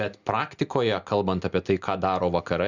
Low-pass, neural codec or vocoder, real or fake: 7.2 kHz; none; real